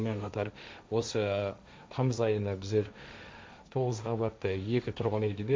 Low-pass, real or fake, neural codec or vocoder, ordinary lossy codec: none; fake; codec, 16 kHz, 1.1 kbps, Voila-Tokenizer; none